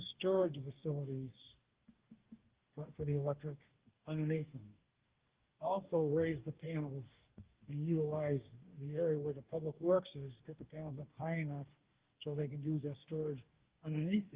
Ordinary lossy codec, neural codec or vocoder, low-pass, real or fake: Opus, 16 kbps; codec, 44.1 kHz, 2.6 kbps, DAC; 3.6 kHz; fake